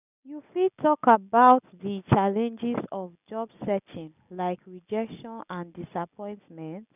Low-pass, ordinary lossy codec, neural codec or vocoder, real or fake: 3.6 kHz; none; none; real